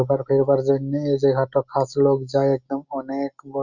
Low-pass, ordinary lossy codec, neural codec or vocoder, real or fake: 7.2 kHz; Opus, 64 kbps; none; real